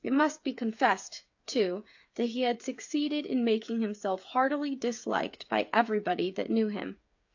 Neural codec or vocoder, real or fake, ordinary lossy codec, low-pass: codec, 16 kHz, 8 kbps, FreqCodec, smaller model; fake; AAC, 48 kbps; 7.2 kHz